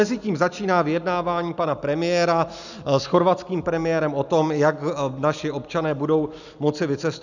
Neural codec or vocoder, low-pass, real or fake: none; 7.2 kHz; real